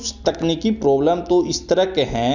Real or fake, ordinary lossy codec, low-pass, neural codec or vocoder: real; none; 7.2 kHz; none